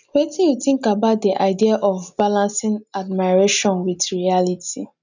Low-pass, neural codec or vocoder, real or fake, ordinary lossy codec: 7.2 kHz; none; real; none